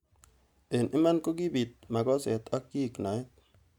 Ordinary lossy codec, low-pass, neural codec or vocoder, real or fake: none; 19.8 kHz; none; real